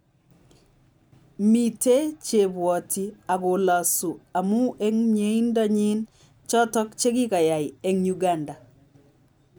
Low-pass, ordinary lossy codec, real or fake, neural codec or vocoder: none; none; real; none